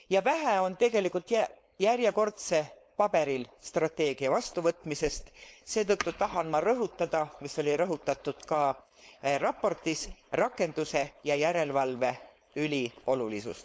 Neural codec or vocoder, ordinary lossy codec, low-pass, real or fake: codec, 16 kHz, 4.8 kbps, FACodec; none; none; fake